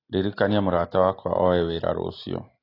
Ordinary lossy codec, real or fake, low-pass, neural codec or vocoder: AAC, 24 kbps; real; 5.4 kHz; none